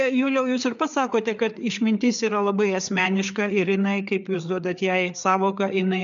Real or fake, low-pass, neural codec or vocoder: fake; 7.2 kHz; codec, 16 kHz, 4 kbps, FreqCodec, larger model